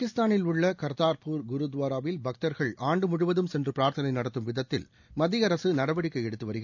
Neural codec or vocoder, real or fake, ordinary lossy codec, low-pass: none; real; none; 7.2 kHz